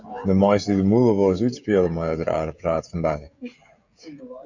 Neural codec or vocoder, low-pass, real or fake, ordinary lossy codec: codec, 16 kHz, 16 kbps, FreqCodec, smaller model; 7.2 kHz; fake; Opus, 64 kbps